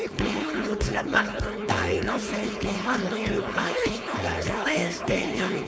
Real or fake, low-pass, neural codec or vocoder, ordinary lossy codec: fake; none; codec, 16 kHz, 4.8 kbps, FACodec; none